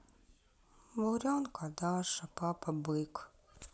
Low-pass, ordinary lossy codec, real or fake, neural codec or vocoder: none; none; real; none